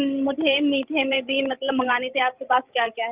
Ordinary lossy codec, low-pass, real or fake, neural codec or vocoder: Opus, 24 kbps; 3.6 kHz; fake; codec, 16 kHz, 16 kbps, FreqCodec, larger model